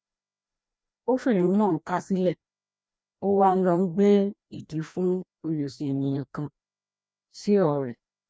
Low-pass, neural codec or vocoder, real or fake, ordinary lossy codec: none; codec, 16 kHz, 1 kbps, FreqCodec, larger model; fake; none